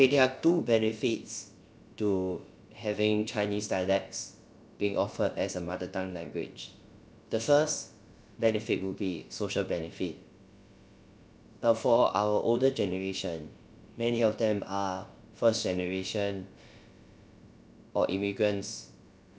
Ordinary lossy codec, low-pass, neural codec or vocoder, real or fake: none; none; codec, 16 kHz, about 1 kbps, DyCAST, with the encoder's durations; fake